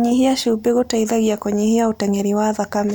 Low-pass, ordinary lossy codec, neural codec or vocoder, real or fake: none; none; none; real